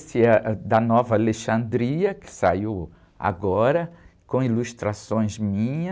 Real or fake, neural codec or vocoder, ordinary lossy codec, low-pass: real; none; none; none